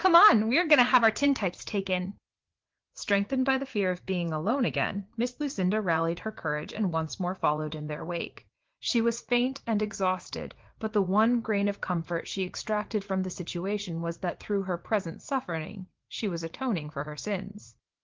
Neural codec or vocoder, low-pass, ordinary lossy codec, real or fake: vocoder, 44.1 kHz, 80 mel bands, Vocos; 7.2 kHz; Opus, 16 kbps; fake